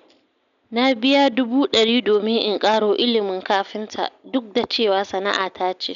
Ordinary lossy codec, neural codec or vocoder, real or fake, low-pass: none; none; real; 7.2 kHz